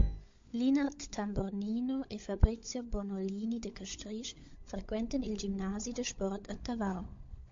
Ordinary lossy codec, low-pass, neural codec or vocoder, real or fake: MP3, 48 kbps; 7.2 kHz; codec, 16 kHz, 16 kbps, FunCodec, trained on LibriTTS, 50 frames a second; fake